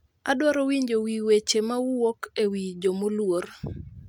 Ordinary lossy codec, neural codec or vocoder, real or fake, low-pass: none; none; real; 19.8 kHz